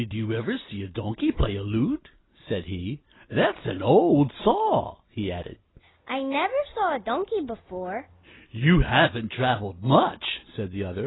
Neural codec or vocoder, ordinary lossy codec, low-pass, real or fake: none; AAC, 16 kbps; 7.2 kHz; real